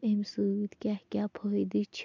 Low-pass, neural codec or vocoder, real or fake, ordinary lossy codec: 7.2 kHz; none; real; MP3, 64 kbps